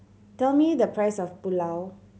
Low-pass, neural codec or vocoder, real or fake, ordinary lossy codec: none; none; real; none